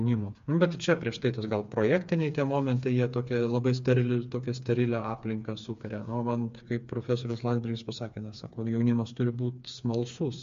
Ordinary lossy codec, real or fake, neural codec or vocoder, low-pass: MP3, 48 kbps; fake; codec, 16 kHz, 4 kbps, FreqCodec, smaller model; 7.2 kHz